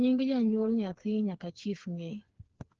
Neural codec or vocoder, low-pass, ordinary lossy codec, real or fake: codec, 16 kHz, 4 kbps, FreqCodec, smaller model; 7.2 kHz; Opus, 16 kbps; fake